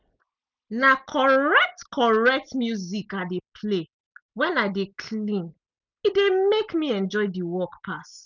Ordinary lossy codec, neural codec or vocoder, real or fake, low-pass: none; none; real; 7.2 kHz